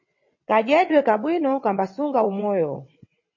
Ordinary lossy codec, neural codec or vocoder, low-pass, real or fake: MP3, 32 kbps; vocoder, 22.05 kHz, 80 mel bands, WaveNeXt; 7.2 kHz; fake